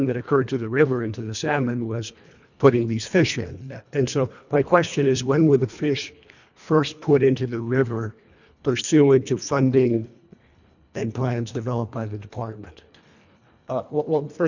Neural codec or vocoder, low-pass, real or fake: codec, 24 kHz, 1.5 kbps, HILCodec; 7.2 kHz; fake